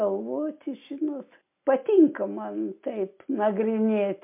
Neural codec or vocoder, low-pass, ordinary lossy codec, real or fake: none; 3.6 kHz; MP3, 32 kbps; real